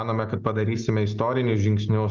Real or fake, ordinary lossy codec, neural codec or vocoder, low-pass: real; Opus, 32 kbps; none; 7.2 kHz